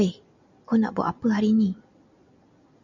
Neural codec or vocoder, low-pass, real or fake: none; 7.2 kHz; real